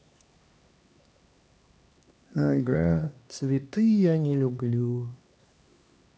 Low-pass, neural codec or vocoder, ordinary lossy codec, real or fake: none; codec, 16 kHz, 2 kbps, X-Codec, HuBERT features, trained on LibriSpeech; none; fake